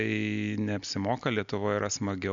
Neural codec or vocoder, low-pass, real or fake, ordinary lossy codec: none; 7.2 kHz; real; Opus, 64 kbps